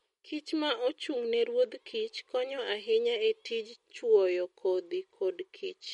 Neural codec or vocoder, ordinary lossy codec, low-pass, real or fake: none; MP3, 48 kbps; 19.8 kHz; real